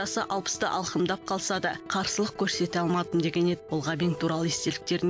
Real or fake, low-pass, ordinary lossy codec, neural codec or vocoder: real; none; none; none